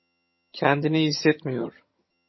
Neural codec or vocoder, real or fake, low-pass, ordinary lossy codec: vocoder, 22.05 kHz, 80 mel bands, HiFi-GAN; fake; 7.2 kHz; MP3, 24 kbps